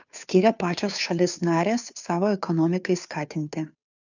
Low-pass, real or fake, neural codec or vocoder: 7.2 kHz; fake; codec, 16 kHz, 2 kbps, FunCodec, trained on Chinese and English, 25 frames a second